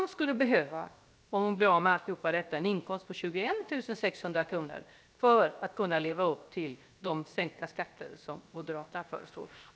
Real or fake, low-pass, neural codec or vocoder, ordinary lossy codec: fake; none; codec, 16 kHz, 0.7 kbps, FocalCodec; none